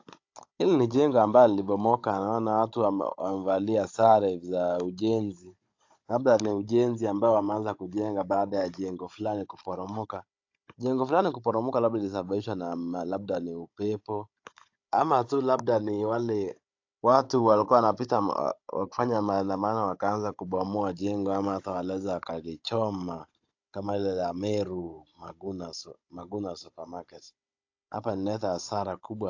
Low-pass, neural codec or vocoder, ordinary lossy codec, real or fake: 7.2 kHz; codec, 16 kHz, 16 kbps, FunCodec, trained on Chinese and English, 50 frames a second; AAC, 48 kbps; fake